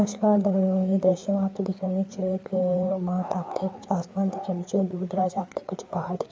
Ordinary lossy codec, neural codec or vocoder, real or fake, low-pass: none; codec, 16 kHz, 4 kbps, FreqCodec, larger model; fake; none